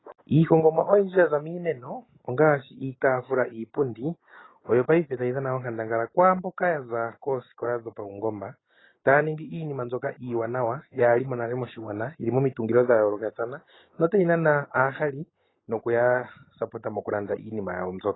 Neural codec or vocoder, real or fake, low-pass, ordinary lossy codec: none; real; 7.2 kHz; AAC, 16 kbps